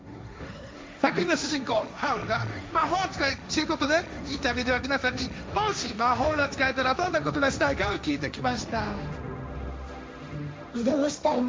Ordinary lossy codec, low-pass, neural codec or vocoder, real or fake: none; none; codec, 16 kHz, 1.1 kbps, Voila-Tokenizer; fake